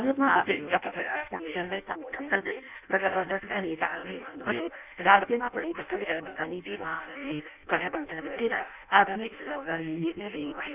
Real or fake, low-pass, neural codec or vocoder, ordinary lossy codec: fake; 3.6 kHz; codec, 16 kHz in and 24 kHz out, 0.6 kbps, FireRedTTS-2 codec; none